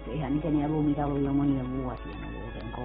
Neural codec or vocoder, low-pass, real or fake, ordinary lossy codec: none; 7.2 kHz; real; AAC, 16 kbps